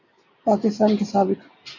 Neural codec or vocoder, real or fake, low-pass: none; real; 7.2 kHz